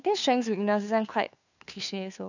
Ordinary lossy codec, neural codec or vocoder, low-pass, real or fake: none; codec, 16 kHz, 2 kbps, FunCodec, trained on Chinese and English, 25 frames a second; 7.2 kHz; fake